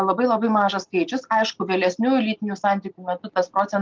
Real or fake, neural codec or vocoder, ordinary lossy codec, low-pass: real; none; Opus, 32 kbps; 7.2 kHz